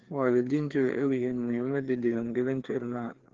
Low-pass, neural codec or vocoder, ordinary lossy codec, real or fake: 7.2 kHz; codec, 16 kHz, 2 kbps, FreqCodec, larger model; Opus, 16 kbps; fake